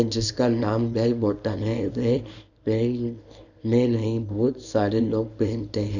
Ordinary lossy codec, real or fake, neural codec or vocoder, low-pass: none; fake; codec, 24 kHz, 0.9 kbps, WavTokenizer, small release; 7.2 kHz